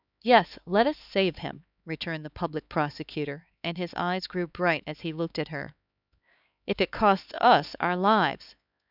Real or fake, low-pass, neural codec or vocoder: fake; 5.4 kHz; codec, 16 kHz, 4 kbps, X-Codec, HuBERT features, trained on LibriSpeech